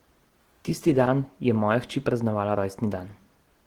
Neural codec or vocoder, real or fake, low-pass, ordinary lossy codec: none; real; 19.8 kHz; Opus, 16 kbps